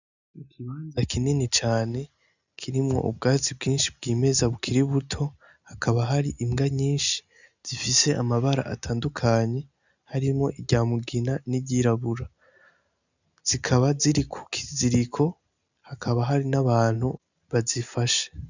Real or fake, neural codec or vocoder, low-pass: real; none; 7.2 kHz